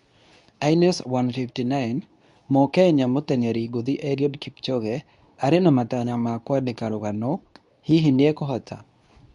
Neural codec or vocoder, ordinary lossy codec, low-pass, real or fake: codec, 24 kHz, 0.9 kbps, WavTokenizer, medium speech release version 2; none; 10.8 kHz; fake